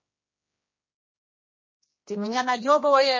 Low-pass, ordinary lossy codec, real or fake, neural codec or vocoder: 7.2 kHz; MP3, 32 kbps; fake; codec, 16 kHz, 1 kbps, X-Codec, HuBERT features, trained on general audio